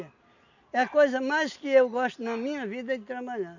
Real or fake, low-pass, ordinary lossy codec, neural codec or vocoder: real; 7.2 kHz; none; none